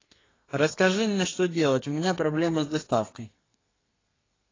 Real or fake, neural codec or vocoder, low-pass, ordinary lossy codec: fake; codec, 32 kHz, 1.9 kbps, SNAC; 7.2 kHz; AAC, 32 kbps